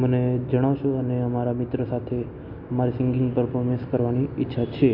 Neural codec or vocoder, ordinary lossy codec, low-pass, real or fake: none; MP3, 48 kbps; 5.4 kHz; real